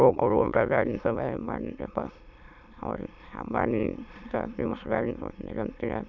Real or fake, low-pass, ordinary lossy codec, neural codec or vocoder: fake; 7.2 kHz; none; autoencoder, 22.05 kHz, a latent of 192 numbers a frame, VITS, trained on many speakers